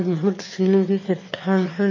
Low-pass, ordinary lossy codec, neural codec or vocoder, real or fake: 7.2 kHz; MP3, 32 kbps; autoencoder, 22.05 kHz, a latent of 192 numbers a frame, VITS, trained on one speaker; fake